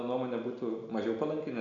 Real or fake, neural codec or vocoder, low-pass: real; none; 7.2 kHz